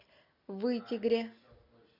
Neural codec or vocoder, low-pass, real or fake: none; 5.4 kHz; real